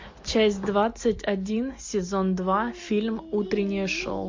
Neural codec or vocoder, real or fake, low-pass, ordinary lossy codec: none; real; 7.2 kHz; MP3, 48 kbps